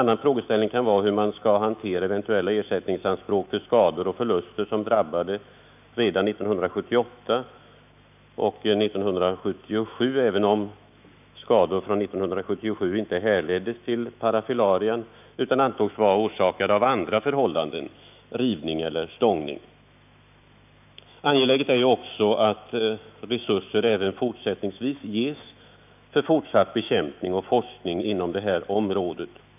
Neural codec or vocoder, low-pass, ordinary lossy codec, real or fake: autoencoder, 48 kHz, 128 numbers a frame, DAC-VAE, trained on Japanese speech; 3.6 kHz; none; fake